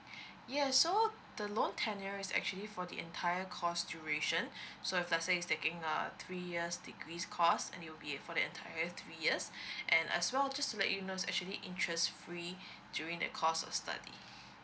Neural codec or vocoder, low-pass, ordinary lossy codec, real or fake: none; none; none; real